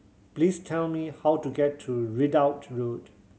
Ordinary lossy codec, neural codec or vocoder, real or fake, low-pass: none; none; real; none